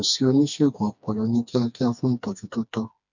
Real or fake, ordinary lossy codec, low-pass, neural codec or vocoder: fake; none; 7.2 kHz; codec, 16 kHz, 2 kbps, FreqCodec, smaller model